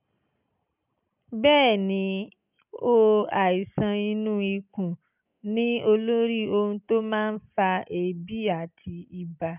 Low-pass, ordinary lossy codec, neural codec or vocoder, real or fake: 3.6 kHz; none; none; real